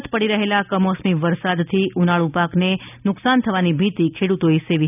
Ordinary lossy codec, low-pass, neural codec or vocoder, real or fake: none; 3.6 kHz; none; real